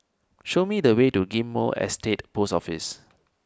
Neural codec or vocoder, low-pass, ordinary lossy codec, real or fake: none; none; none; real